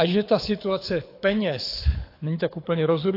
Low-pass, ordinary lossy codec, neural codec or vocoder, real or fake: 5.4 kHz; AAC, 32 kbps; codec, 24 kHz, 6 kbps, HILCodec; fake